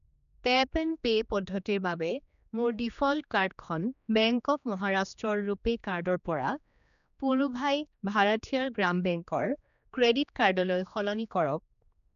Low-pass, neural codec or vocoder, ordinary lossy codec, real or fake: 7.2 kHz; codec, 16 kHz, 2 kbps, X-Codec, HuBERT features, trained on general audio; none; fake